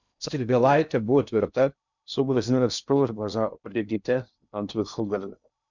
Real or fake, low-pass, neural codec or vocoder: fake; 7.2 kHz; codec, 16 kHz in and 24 kHz out, 0.6 kbps, FocalCodec, streaming, 2048 codes